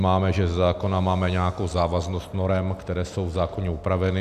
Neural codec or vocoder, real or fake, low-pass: none; real; 14.4 kHz